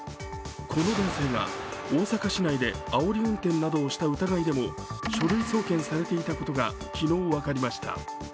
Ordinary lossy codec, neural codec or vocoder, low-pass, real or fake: none; none; none; real